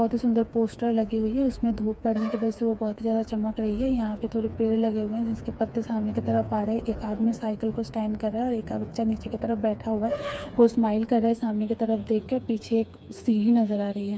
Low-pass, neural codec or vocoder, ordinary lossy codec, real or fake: none; codec, 16 kHz, 4 kbps, FreqCodec, smaller model; none; fake